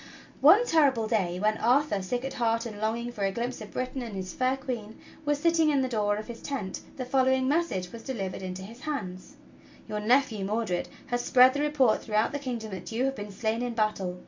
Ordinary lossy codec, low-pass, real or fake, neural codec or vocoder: MP3, 48 kbps; 7.2 kHz; real; none